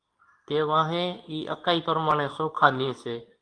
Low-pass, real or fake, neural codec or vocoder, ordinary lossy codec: 9.9 kHz; fake; codec, 24 kHz, 0.9 kbps, WavTokenizer, medium speech release version 2; Opus, 32 kbps